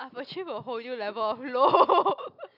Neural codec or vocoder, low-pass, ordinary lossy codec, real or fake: none; 5.4 kHz; none; real